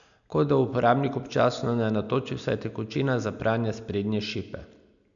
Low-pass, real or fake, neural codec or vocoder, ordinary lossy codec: 7.2 kHz; real; none; none